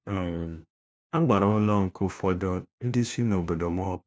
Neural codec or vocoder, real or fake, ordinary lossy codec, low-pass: codec, 16 kHz, 1 kbps, FunCodec, trained on LibriTTS, 50 frames a second; fake; none; none